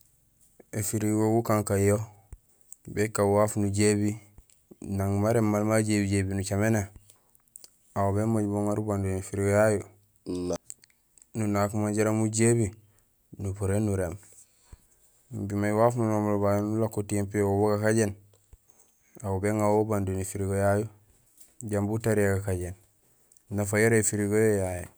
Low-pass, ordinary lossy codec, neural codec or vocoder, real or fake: none; none; none; real